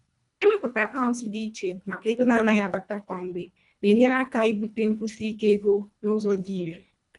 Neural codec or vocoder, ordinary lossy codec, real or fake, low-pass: codec, 24 kHz, 1.5 kbps, HILCodec; none; fake; 10.8 kHz